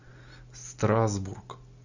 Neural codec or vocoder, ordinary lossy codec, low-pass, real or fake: none; Opus, 64 kbps; 7.2 kHz; real